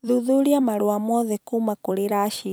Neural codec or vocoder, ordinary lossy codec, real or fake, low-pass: vocoder, 44.1 kHz, 128 mel bands every 256 samples, BigVGAN v2; none; fake; none